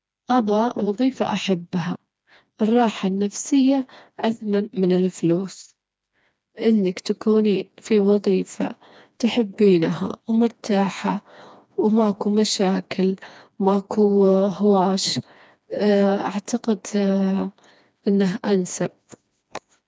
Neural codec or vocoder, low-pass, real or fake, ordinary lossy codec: codec, 16 kHz, 2 kbps, FreqCodec, smaller model; none; fake; none